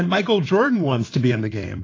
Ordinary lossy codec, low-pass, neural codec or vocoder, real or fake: AAC, 32 kbps; 7.2 kHz; codec, 16 kHz in and 24 kHz out, 2.2 kbps, FireRedTTS-2 codec; fake